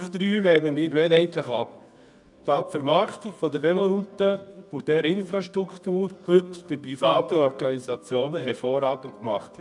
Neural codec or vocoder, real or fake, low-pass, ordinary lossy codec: codec, 24 kHz, 0.9 kbps, WavTokenizer, medium music audio release; fake; 10.8 kHz; none